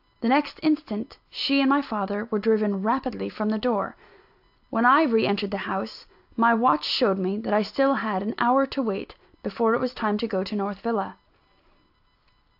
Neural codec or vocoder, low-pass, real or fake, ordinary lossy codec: none; 5.4 kHz; real; AAC, 48 kbps